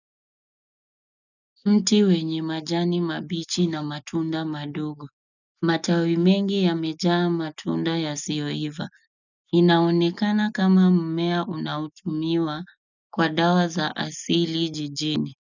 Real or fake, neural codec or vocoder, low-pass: real; none; 7.2 kHz